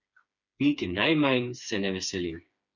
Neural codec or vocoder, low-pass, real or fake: codec, 16 kHz, 4 kbps, FreqCodec, smaller model; 7.2 kHz; fake